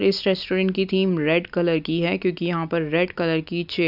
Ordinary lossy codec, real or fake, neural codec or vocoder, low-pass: none; real; none; 5.4 kHz